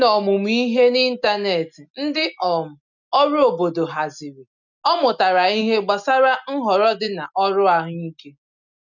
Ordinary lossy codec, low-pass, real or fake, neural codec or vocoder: none; 7.2 kHz; real; none